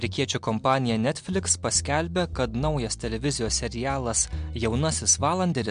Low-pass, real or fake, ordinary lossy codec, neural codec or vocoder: 9.9 kHz; real; MP3, 64 kbps; none